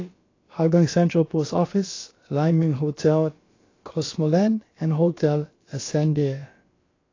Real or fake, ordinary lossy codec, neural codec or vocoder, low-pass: fake; AAC, 32 kbps; codec, 16 kHz, about 1 kbps, DyCAST, with the encoder's durations; 7.2 kHz